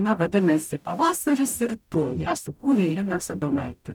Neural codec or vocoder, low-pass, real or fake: codec, 44.1 kHz, 0.9 kbps, DAC; 19.8 kHz; fake